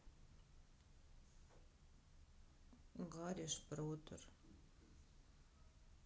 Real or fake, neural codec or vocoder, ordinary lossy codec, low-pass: real; none; none; none